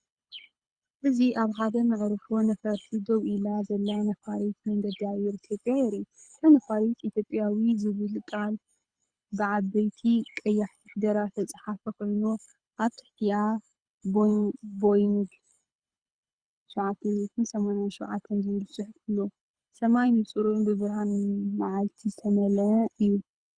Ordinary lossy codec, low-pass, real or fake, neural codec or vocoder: Opus, 64 kbps; 9.9 kHz; fake; codec, 24 kHz, 6 kbps, HILCodec